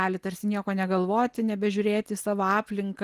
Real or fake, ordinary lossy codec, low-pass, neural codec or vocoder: real; Opus, 24 kbps; 14.4 kHz; none